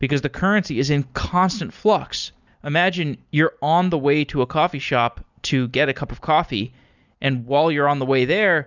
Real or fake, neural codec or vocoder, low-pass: real; none; 7.2 kHz